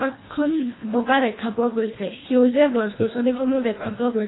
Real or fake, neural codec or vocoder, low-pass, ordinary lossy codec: fake; codec, 24 kHz, 1.5 kbps, HILCodec; 7.2 kHz; AAC, 16 kbps